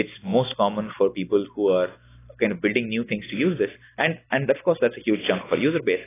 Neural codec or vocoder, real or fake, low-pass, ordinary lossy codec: none; real; 3.6 kHz; AAC, 16 kbps